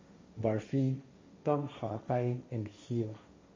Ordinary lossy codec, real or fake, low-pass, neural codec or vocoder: MP3, 32 kbps; fake; 7.2 kHz; codec, 16 kHz, 1.1 kbps, Voila-Tokenizer